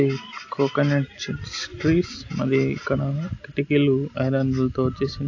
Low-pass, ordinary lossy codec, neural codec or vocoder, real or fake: 7.2 kHz; AAC, 48 kbps; none; real